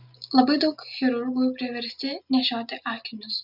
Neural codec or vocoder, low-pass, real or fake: none; 5.4 kHz; real